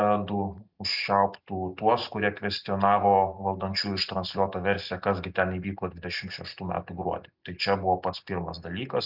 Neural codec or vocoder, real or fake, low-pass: none; real; 5.4 kHz